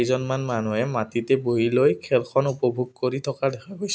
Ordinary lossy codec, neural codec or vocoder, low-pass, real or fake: none; none; none; real